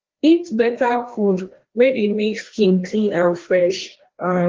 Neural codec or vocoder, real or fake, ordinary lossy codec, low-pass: codec, 16 kHz, 1 kbps, FreqCodec, larger model; fake; Opus, 16 kbps; 7.2 kHz